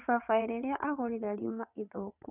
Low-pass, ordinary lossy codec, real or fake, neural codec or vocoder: 3.6 kHz; none; fake; vocoder, 22.05 kHz, 80 mel bands, WaveNeXt